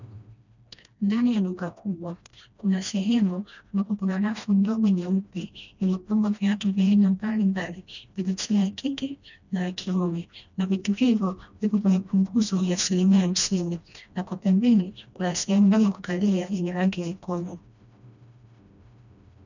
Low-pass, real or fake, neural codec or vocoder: 7.2 kHz; fake; codec, 16 kHz, 1 kbps, FreqCodec, smaller model